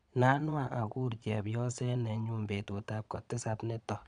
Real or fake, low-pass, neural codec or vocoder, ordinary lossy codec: fake; 9.9 kHz; vocoder, 22.05 kHz, 80 mel bands, Vocos; none